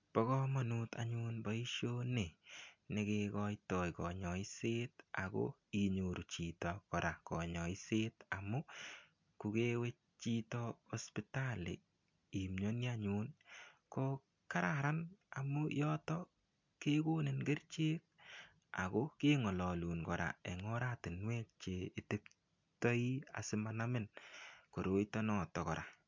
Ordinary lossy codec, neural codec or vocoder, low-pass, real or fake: MP3, 64 kbps; none; 7.2 kHz; real